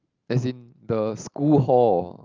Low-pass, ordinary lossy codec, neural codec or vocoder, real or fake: 7.2 kHz; Opus, 32 kbps; none; real